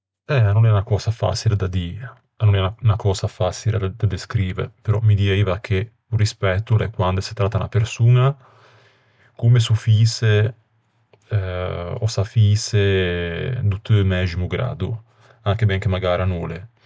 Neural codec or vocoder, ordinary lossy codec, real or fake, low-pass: none; none; real; none